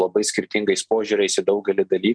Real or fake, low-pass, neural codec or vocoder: real; 9.9 kHz; none